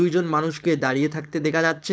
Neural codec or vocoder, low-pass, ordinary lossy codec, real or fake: codec, 16 kHz, 4.8 kbps, FACodec; none; none; fake